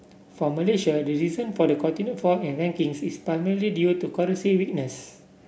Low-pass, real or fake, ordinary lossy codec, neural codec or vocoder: none; real; none; none